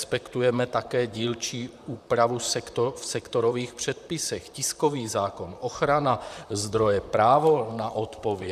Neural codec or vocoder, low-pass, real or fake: vocoder, 44.1 kHz, 128 mel bands, Pupu-Vocoder; 14.4 kHz; fake